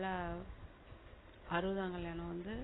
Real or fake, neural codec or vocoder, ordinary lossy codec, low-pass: real; none; AAC, 16 kbps; 7.2 kHz